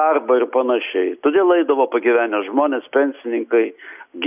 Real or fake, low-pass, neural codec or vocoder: real; 3.6 kHz; none